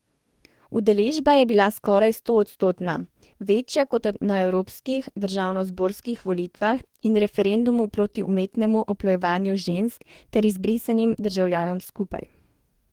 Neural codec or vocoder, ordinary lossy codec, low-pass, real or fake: codec, 44.1 kHz, 2.6 kbps, DAC; Opus, 24 kbps; 19.8 kHz; fake